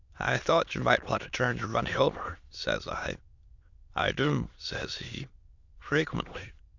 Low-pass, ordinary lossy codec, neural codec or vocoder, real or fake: 7.2 kHz; Opus, 64 kbps; autoencoder, 22.05 kHz, a latent of 192 numbers a frame, VITS, trained on many speakers; fake